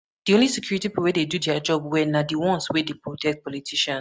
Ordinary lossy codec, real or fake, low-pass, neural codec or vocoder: none; real; none; none